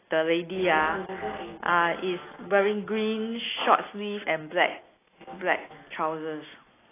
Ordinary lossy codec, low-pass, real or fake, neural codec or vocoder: AAC, 16 kbps; 3.6 kHz; real; none